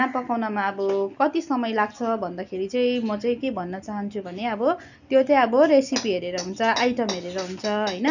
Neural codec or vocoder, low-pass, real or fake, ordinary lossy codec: none; 7.2 kHz; real; none